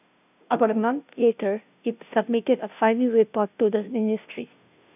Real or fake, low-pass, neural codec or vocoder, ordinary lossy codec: fake; 3.6 kHz; codec, 16 kHz, 0.5 kbps, FunCodec, trained on Chinese and English, 25 frames a second; none